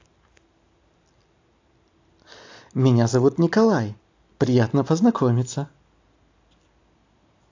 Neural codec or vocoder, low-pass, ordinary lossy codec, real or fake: none; 7.2 kHz; AAC, 48 kbps; real